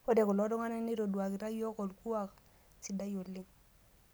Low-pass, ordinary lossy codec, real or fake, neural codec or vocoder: none; none; real; none